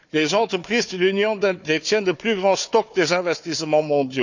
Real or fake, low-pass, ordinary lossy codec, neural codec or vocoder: fake; 7.2 kHz; none; codec, 16 kHz, 4 kbps, FunCodec, trained on Chinese and English, 50 frames a second